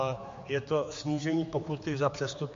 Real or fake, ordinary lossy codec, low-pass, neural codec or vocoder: fake; MP3, 48 kbps; 7.2 kHz; codec, 16 kHz, 4 kbps, X-Codec, HuBERT features, trained on general audio